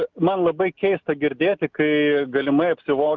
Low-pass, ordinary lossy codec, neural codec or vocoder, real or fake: 7.2 kHz; Opus, 24 kbps; none; real